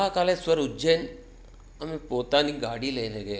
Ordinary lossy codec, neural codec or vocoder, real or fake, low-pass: none; none; real; none